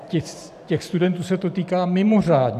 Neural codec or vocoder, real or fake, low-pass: vocoder, 44.1 kHz, 128 mel bands every 512 samples, BigVGAN v2; fake; 14.4 kHz